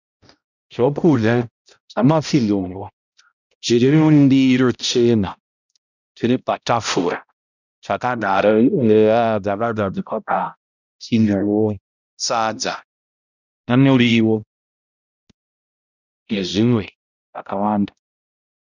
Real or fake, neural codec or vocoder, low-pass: fake; codec, 16 kHz, 0.5 kbps, X-Codec, HuBERT features, trained on balanced general audio; 7.2 kHz